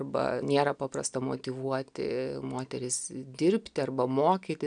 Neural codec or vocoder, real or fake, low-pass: none; real; 9.9 kHz